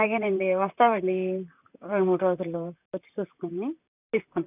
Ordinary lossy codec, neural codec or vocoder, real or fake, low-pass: none; vocoder, 44.1 kHz, 128 mel bands, Pupu-Vocoder; fake; 3.6 kHz